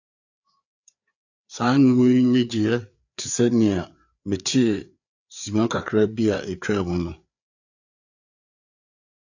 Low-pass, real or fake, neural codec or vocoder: 7.2 kHz; fake; codec, 16 kHz, 4 kbps, FreqCodec, larger model